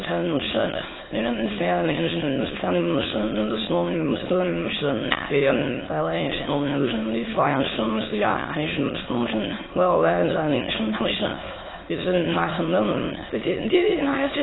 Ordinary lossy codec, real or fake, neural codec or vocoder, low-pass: AAC, 16 kbps; fake; autoencoder, 22.05 kHz, a latent of 192 numbers a frame, VITS, trained on many speakers; 7.2 kHz